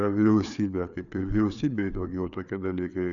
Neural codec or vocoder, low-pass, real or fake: codec, 16 kHz, 4 kbps, FreqCodec, larger model; 7.2 kHz; fake